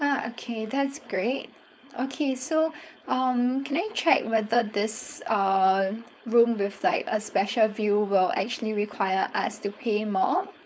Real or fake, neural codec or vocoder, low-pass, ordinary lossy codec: fake; codec, 16 kHz, 4.8 kbps, FACodec; none; none